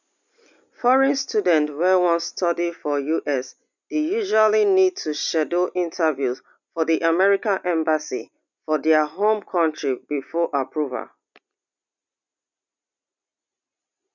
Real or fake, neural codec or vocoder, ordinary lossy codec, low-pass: real; none; none; 7.2 kHz